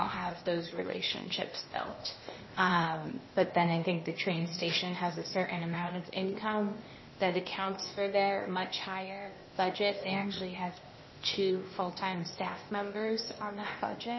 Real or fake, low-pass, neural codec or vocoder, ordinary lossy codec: fake; 7.2 kHz; codec, 16 kHz, 0.8 kbps, ZipCodec; MP3, 24 kbps